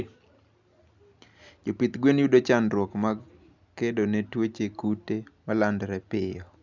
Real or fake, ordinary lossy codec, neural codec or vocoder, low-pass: real; none; none; 7.2 kHz